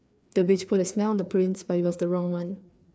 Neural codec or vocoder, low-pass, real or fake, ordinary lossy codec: codec, 16 kHz, 2 kbps, FreqCodec, larger model; none; fake; none